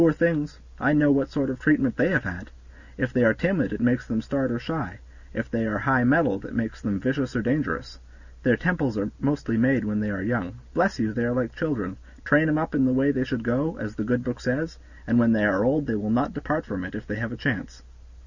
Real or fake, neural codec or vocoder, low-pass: real; none; 7.2 kHz